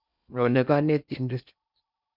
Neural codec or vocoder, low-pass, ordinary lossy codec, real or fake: codec, 16 kHz in and 24 kHz out, 0.8 kbps, FocalCodec, streaming, 65536 codes; 5.4 kHz; none; fake